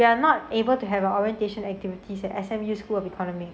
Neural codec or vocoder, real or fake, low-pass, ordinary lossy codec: none; real; none; none